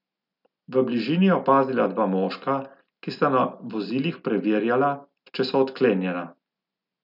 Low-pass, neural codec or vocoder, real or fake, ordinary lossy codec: 5.4 kHz; none; real; none